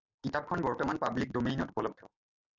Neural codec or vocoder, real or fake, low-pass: none; real; 7.2 kHz